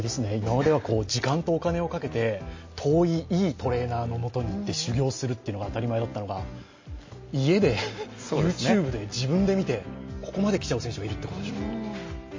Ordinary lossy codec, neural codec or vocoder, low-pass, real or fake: MP3, 32 kbps; none; 7.2 kHz; real